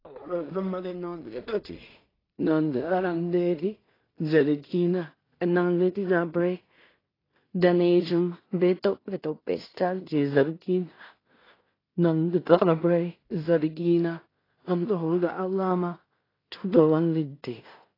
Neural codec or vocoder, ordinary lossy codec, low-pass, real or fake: codec, 16 kHz in and 24 kHz out, 0.4 kbps, LongCat-Audio-Codec, two codebook decoder; AAC, 24 kbps; 5.4 kHz; fake